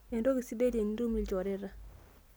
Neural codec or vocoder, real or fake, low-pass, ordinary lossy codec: none; real; none; none